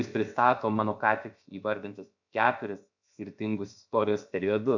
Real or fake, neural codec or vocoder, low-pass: fake; codec, 16 kHz, about 1 kbps, DyCAST, with the encoder's durations; 7.2 kHz